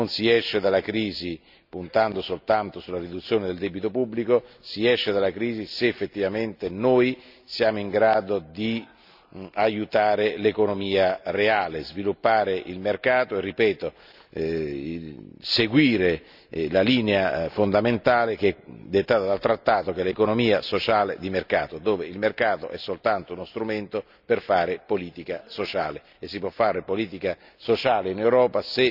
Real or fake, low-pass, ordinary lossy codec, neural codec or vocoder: real; 5.4 kHz; none; none